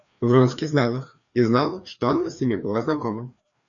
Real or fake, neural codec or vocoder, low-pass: fake; codec, 16 kHz, 2 kbps, FreqCodec, larger model; 7.2 kHz